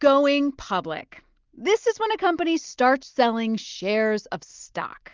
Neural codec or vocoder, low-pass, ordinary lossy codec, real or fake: none; 7.2 kHz; Opus, 24 kbps; real